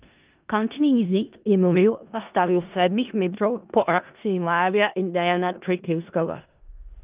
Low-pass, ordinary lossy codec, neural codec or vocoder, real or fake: 3.6 kHz; Opus, 24 kbps; codec, 16 kHz in and 24 kHz out, 0.4 kbps, LongCat-Audio-Codec, four codebook decoder; fake